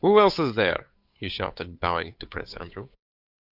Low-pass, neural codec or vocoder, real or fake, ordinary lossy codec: 5.4 kHz; codec, 16 kHz, 8 kbps, FunCodec, trained on Chinese and English, 25 frames a second; fake; Opus, 64 kbps